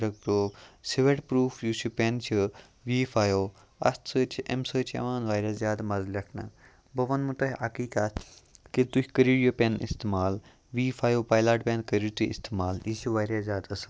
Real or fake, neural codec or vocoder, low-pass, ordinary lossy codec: real; none; none; none